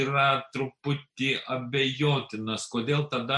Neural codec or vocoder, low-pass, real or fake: none; 10.8 kHz; real